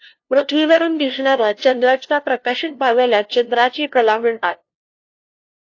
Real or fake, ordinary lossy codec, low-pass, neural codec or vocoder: fake; AAC, 48 kbps; 7.2 kHz; codec, 16 kHz, 0.5 kbps, FunCodec, trained on LibriTTS, 25 frames a second